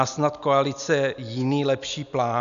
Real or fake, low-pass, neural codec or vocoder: real; 7.2 kHz; none